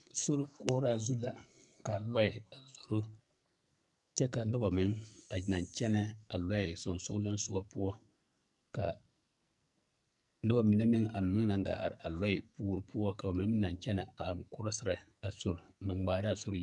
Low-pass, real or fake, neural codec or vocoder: 10.8 kHz; fake; codec, 44.1 kHz, 2.6 kbps, SNAC